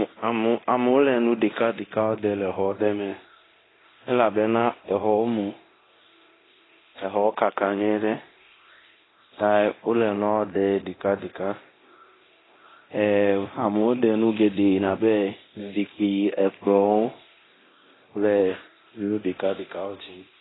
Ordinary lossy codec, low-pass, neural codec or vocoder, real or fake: AAC, 16 kbps; 7.2 kHz; codec, 24 kHz, 0.9 kbps, DualCodec; fake